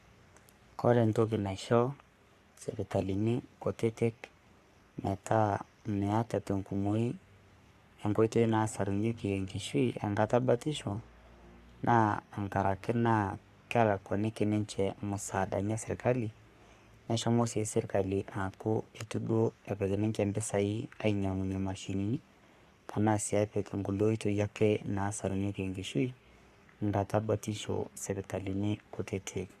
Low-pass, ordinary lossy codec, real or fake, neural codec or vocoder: 14.4 kHz; Opus, 64 kbps; fake; codec, 44.1 kHz, 3.4 kbps, Pupu-Codec